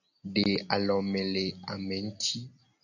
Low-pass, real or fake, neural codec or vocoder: 7.2 kHz; real; none